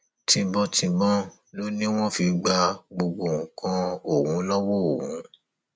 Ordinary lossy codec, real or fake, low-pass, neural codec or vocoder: none; real; none; none